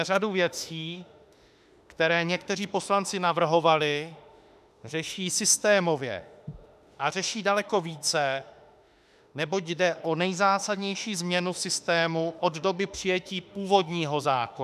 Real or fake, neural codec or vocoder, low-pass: fake; autoencoder, 48 kHz, 32 numbers a frame, DAC-VAE, trained on Japanese speech; 14.4 kHz